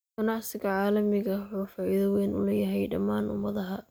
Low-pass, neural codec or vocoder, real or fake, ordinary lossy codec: none; none; real; none